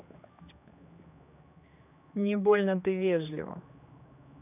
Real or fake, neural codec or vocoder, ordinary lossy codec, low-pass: fake; codec, 16 kHz, 2 kbps, X-Codec, HuBERT features, trained on general audio; none; 3.6 kHz